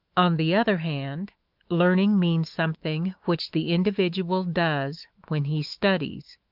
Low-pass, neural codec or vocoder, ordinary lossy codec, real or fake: 5.4 kHz; autoencoder, 48 kHz, 128 numbers a frame, DAC-VAE, trained on Japanese speech; Opus, 24 kbps; fake